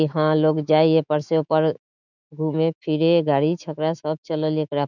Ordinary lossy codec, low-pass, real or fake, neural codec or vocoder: none; 7.2 kHz; fake; autoencoder, 48 kHz, 128 numbers a frame, DAC-VAE, trained on Japanese speech